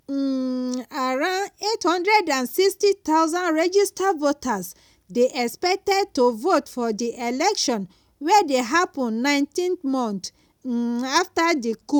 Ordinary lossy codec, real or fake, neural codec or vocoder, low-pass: none; real; none; none